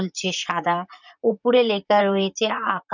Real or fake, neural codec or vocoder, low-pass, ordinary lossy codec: fake; vocoder, 44.1 kHz, 128 mel bands, Pupu-Vocoder; 7.2 kHz; none